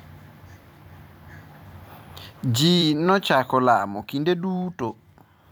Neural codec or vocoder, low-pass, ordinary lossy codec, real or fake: vocoder, 44.1 kHz, 128 mel bands every 256 samples, BigVGAN v2; none; none; fake